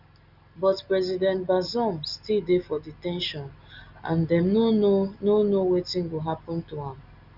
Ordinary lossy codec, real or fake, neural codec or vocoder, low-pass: Opus, 64 kbps; real; none; 5.4 kHz